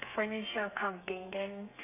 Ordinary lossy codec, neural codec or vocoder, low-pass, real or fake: none; codec, 32 kHz, 1.9 kbps, SNAC; 3.6 kHz; fake